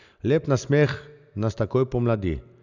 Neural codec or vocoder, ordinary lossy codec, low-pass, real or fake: none; none; 7.2 kHz; real